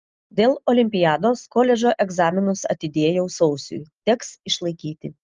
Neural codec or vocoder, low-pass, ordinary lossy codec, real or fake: none; 7.2 kHz; Opus, 24 kbps; real